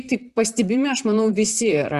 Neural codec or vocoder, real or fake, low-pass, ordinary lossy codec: vocoder, 44.1 kHz, 128 mel bands, Pupu-Vocoder; fake; 14.4 kHz; Opus, 64 kbps